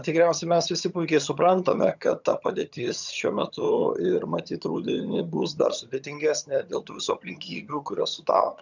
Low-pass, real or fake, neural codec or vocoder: 7.2 kHz; fake; vocoder, 22.05 kHz, 80 mel bands, HiFi-GAN